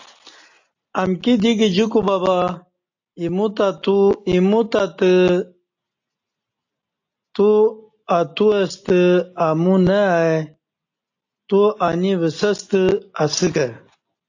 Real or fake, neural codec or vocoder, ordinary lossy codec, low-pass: real; none; AAC, 48 kbps; 7.2 kHz